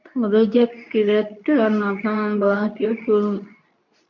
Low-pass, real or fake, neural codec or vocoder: 7.2 kHz; fake; codec, 24 kHz, 0.9 kbps, WavTokenizer, medium speech release version 2